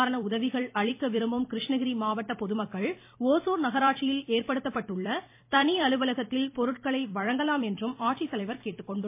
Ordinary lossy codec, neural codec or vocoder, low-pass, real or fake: MP3, 24 kbps; vocoder, 44.1 kHz, 128 mel bands every 256 samples, BigVGAN v2; 3.6 kHz; fake